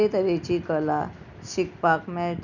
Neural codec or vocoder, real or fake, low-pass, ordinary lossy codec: none; real; 7.2 kHz; none